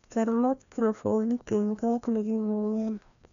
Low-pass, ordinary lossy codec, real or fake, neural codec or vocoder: 7.2 kHz; none; fake; codec, 16 kHz, 1 kbps, FreqCodec, larger model